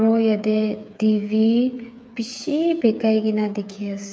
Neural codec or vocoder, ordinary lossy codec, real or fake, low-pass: codec, 16 kHz, 8 kbps, FreqCodec, smaller model; none; fake; none